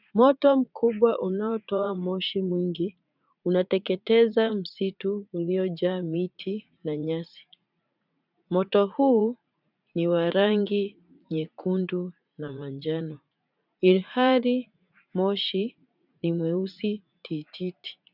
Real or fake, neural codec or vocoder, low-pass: fake; vocoder, 44.1 kHz, 80 mel bands, Vocos; 5.4 kHz